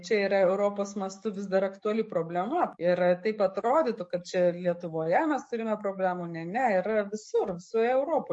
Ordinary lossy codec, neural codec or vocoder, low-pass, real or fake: MP3, 48 kbps; codec, 44.1 kHz, 7.8 kbps, DAC; 10.8 kHz; fake